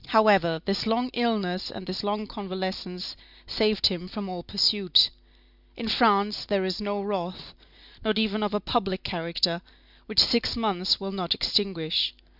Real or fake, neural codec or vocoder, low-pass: real; none; 5.4 kHz